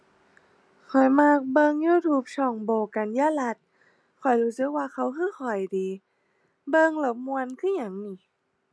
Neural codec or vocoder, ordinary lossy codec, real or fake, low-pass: none; none; real; none